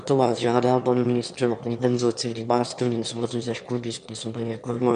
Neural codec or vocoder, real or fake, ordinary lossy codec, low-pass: autoencoder, 22.05 kHz, a latent of 192 numbers a frame, VITS, trained on one speaker; fake; MP3, 64 kbps; 9.9 kHz